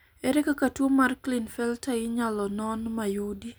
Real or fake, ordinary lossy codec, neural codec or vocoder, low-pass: real; none; none; none